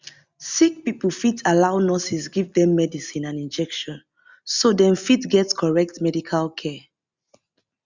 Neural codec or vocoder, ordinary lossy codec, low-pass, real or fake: none; Opus, 64 kbps; 7.2 kHz; real